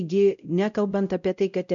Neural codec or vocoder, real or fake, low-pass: codec, 16 kHz, 0.5 kbps, X-Codec, WavLM features, trained on Multilingual LibriSpeech; fake; 7.2 kHz